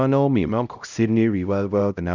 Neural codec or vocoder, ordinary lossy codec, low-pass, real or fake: codec, 16 kHz, 0.5 kbps, X-Codec, HuBERT features, trained on LibriSpeech; none; 7.2 kHz; fake